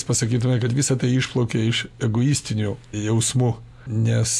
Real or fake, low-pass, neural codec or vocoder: real; 14.4 kHz; none